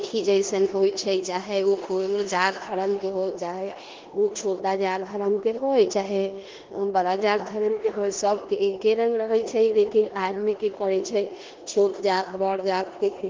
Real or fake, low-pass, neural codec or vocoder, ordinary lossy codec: fake; 7.2 kHz; codec, 16 kHz in and 24 kHz out, 0.9 kbps, LongCat-Audio-Codec, four codebook decoder; Opus, 16 kbps